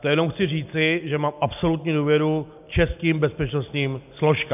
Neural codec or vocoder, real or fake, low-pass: none; real; 3.6 kHz